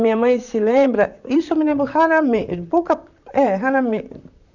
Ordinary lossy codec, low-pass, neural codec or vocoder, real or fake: none; 7.2 kHz; vocoder, 44.1 kHz, 128 mel bands, Pupu-Vocoder; fake